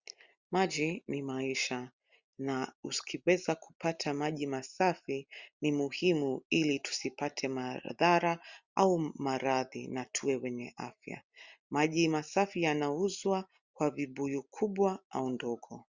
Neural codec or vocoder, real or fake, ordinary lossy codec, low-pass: none; real; Opus, 64 kbps; 7.2 kHz